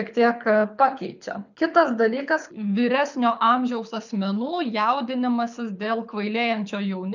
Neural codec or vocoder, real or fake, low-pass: codec, 24 kHz, 6 kbps, HILCodec; fake; 7.2 kHz